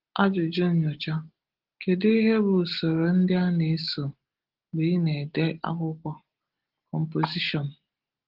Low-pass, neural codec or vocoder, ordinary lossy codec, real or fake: 5.4 kHz; none; Opus, 16 kbps; real